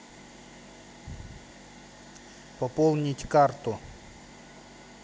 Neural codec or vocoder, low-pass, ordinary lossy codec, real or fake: none; none; none; real